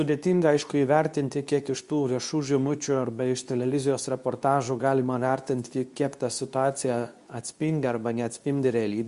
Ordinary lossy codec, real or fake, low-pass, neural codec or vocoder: Opus, 64 kbps; fake; 10.8 kHz; codec, 24 kHz, 0.9 kbps, WavTokenizer, medium speech release version 2